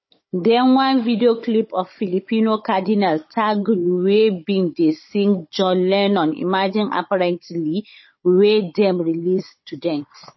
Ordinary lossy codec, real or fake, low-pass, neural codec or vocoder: MP3, 24 kbps; fake; 7.2 kHz; codec, 16 kHz, 16 kbps, FunCodec, trained on Chinese and English, 50 frames a second